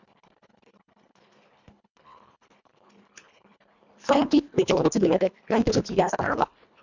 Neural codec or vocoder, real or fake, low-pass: codec, 24 kHz, 1.5 kbps, HILCodec; fake; 7.2 kHz